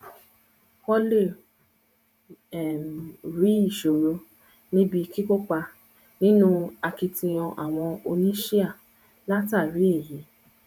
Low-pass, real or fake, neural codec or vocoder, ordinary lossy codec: 19.8 kHz; fake; vocoder, 48 kHz, 128 mel bands, Vocos; none